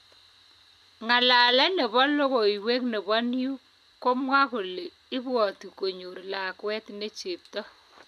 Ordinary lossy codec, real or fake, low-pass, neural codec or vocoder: MP3, 96 kbps; real; 14.4 kHz; none